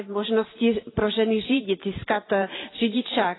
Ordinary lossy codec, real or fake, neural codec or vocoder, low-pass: AAC, 16 kbps; fake; vocoder, 44.1 kHz, 128 mel bands every 512 samples, BigVGAN v2; 7.2 kHz